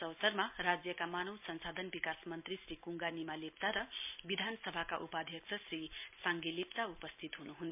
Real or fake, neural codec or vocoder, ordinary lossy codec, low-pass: real; none; MP3, 32 kbps; 3.6 kHz